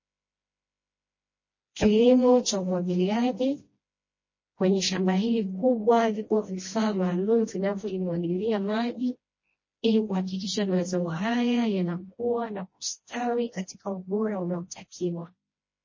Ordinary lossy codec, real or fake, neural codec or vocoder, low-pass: MP3, 32 kbps; fake; codec, 16 kHz, 1 kbps, FreqCodec, smaller model; 7.2 kHz